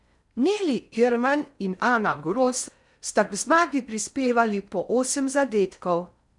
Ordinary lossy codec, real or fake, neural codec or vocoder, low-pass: none; fake; codec, 16 kHz in and 24 kHz out, 0.6 kbps, FocalCodec, streaming, 4096 codes; 10.8 kHz